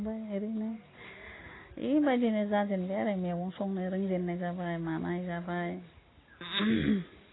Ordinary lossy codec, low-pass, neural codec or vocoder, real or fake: AAC, 16 kbps; 7.2 kHz; none; real